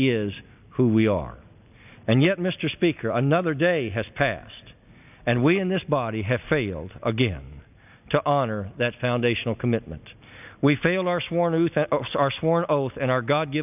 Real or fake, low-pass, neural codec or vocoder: real; 3.6 kHz; none